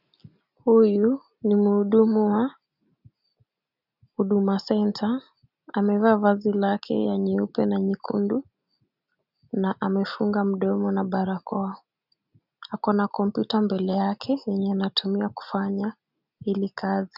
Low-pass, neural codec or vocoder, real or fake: 5.4 kHz; none; real